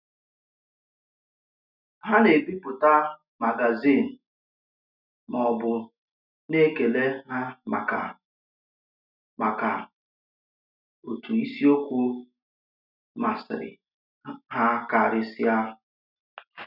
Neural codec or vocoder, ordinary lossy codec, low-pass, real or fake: none; none; 5.4 kHz; real